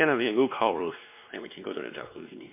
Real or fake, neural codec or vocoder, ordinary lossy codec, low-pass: fake; codec, 16 kHz, 2 kbps, X-Codec, WavLM features, trained on Multilingual LibriSpeech; MP3, 24 kbps; 3.6 kHz